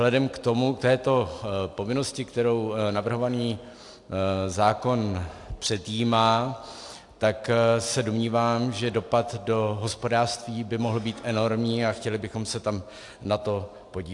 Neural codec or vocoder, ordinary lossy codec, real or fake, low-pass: none; AAC, 64 kbps; real; 10.8 kHz